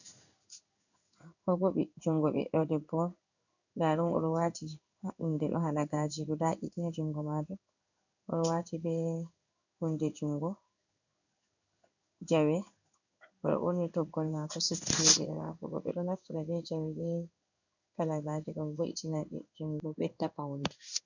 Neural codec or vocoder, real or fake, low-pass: codec, 16 kHz in and 24 kHz out, 1 kbps, XY-Tokenizer; fake; 7.2 kHz